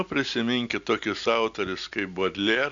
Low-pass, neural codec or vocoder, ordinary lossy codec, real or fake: 7.2 kHz; none; MP3, 64 kbps; real